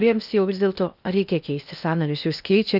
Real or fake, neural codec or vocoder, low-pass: fake; codec, 16 kHz in and 24 kHz out, 0.6 kbps, FocalCodec, streaming, 2048 codes; 5.4 kHz